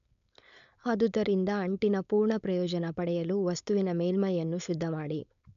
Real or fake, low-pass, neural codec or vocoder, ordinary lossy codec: fake; 7.2 kHz; codec, 16 kHz, 4.8 kbps, FACodec; none